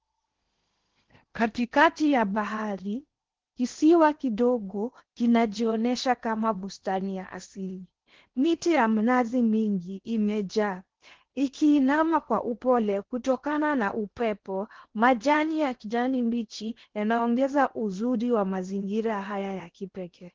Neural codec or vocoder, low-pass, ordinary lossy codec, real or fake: codec, 16 kHz in and 24 kHz out, 0.8 kbps, FocalCodec, streaming, 65536 codes; 7.2 kHz; Opus, 16 kbps; fake